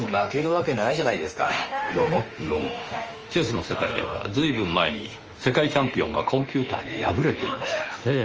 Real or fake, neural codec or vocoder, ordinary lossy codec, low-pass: fake; autoencoder, 48 kHz, 32 numbers a frame, DAC-VAE, trained on Japanese speech; Opus, 24 kbps; 7.2 kHz